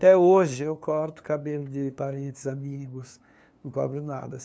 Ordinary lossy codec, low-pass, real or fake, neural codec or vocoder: none; none; fake; codec, 16 kHz, 2 kbps, FunCodec, trained on LibriTTS, 25 frames a second